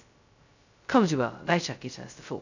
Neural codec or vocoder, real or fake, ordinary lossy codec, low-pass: codec, 16 kHz, 0.2 kbps, FocalCodec; fake; none; 7.2 kHz